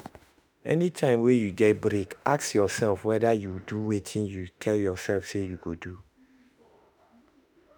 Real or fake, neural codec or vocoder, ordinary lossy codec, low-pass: fake; autoencoder, 48 kHz, 32 numbers a frame, DAC-VAE, trained on Japanese speech; none; none